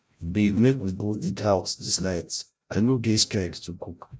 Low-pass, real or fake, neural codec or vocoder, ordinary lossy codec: none; fake; codec, 16 kHz, 0.5 kbps, FreqCodec, larger model; none